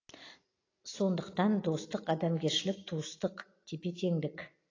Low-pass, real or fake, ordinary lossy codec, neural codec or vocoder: 7.2 kHz; real; AAC, 32 kbps; none